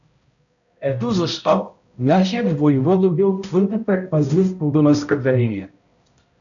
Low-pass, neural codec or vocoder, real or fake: 7.2 kHz; codec, 16 kHz, 0.5 kbps, X-Codec, HuBERT features, trained on balanced general audio; fake